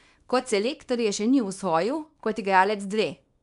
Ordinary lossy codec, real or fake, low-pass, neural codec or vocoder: none; fake; 10.8 kHz; codec, 24 kHz, 0.9 kbps, WavTokenizer, small release